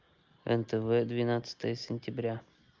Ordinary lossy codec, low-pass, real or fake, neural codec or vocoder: Opus, 24 kbps; 7.2 kHz; real; none